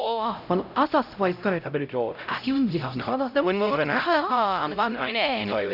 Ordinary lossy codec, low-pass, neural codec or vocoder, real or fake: none; 5.4 kHz; codec, 16 kHz, 0.5 kbps, X-Codec, HuBERT features, trained on LibriSpeech; fake